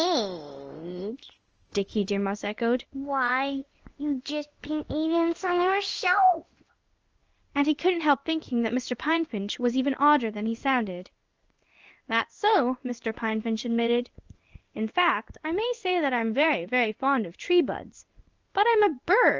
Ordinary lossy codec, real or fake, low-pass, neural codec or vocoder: Opus, 24 kbps; fake; 7.2 kHz; codec, 16 kHz in and 24 kHz out, 1 kbps, XY-Tokenizer